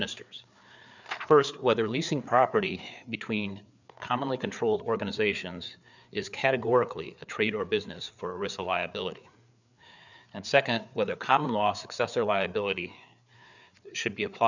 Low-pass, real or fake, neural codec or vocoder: 7.2 kHz; fake; codec, 16 kHz, 4 kbps, FreqCodec, larger model